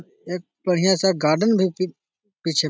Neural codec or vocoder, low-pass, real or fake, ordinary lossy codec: none; none; real; none